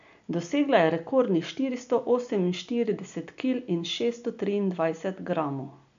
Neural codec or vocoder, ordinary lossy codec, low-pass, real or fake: none; MP3, 64 kbps; 7.2 kHz; real